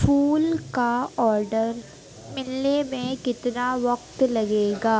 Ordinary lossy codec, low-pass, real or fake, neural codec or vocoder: none; none; real; none